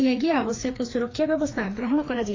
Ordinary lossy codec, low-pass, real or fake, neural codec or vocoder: AAC, 32 kbps; 7.2 kHz; fake; codec, 16 kHz, 2 kbps, FreqCodec, larger model